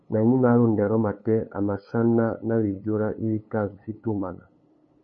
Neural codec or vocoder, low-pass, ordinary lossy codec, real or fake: codec, 16 kHz, 8 kbps, FunCodec, trained on LibriTTS, 25 frames a second; 7.2 kHz; MP3, 32 kbps; fake